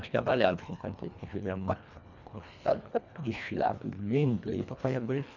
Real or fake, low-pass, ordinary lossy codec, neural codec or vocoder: fake; 7.2 kHz; none; codec, 24 kHz, 1.5 kbps, HILCodec